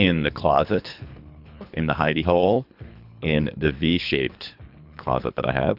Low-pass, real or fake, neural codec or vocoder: 5.4 kHz; fake; codec, 24 kHz, 3 kbps, HILCodec